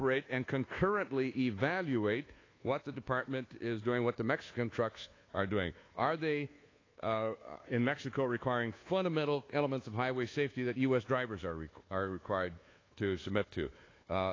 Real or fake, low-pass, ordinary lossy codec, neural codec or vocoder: fake; 7.2 kHz; AAC, 32 kbps; codec, 24 kHz, 1.2 kbps, DualCodec